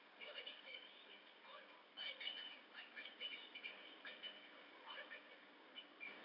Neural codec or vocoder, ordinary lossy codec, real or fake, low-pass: codec, 16 kHz in and 24 kHz out, 1 kbps, XY-Tokenizer; none; fake; 5.4 kHz